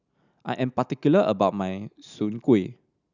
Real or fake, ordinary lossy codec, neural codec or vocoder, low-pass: real; none; none; 7.2 kHz